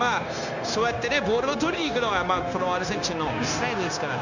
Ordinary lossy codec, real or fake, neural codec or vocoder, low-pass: none; fake; codec, 16 kHz, 0.9 kbps, LongCat-Audio-Codec; 7.2 kHz